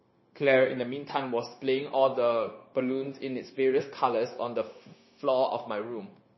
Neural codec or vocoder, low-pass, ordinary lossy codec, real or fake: codec, 16 kHz, 0.9 kbps, LongCat-Audio-Codec; 7.2 kHz; MP3, 24 kbps; fake